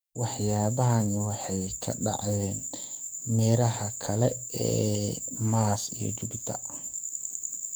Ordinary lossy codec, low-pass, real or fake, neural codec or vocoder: none; none; fake; codec, 44.1 kHz, 7.8 kbps, DAC